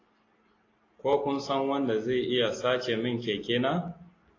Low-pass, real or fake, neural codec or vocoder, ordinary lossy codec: 7.2 kHz; real; none; AAC, 32 kbps